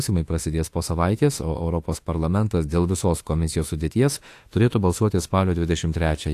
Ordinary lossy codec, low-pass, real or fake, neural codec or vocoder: AAC, 64 kbps; 14.4 kHz; fake; autoencoder, 48 kHz, 32 numbers a frame, DAC-VAE, trained on Japanese speech